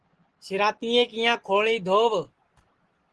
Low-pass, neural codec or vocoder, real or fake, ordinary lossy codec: 10.8 kHz; none; real; Opus, 16 kbps